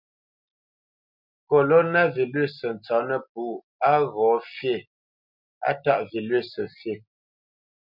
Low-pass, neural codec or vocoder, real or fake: 5.4 kHz; none; real